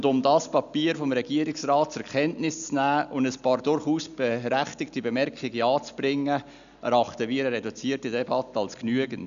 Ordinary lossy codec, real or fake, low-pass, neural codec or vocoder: none; real; 7.2 kHz; none